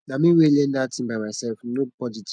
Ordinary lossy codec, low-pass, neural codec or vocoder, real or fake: none; 9.9 kHz; none; real